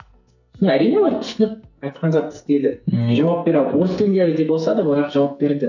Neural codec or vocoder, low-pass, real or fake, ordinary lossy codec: codec, 44.1 kHz, 2.6 kbps, SNAC; 7.2 kHz; fake; none